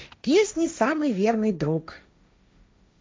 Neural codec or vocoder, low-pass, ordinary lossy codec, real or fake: codec, 16 kHz, 1.1 kbps, Voila-Tokenizer; none; none; fake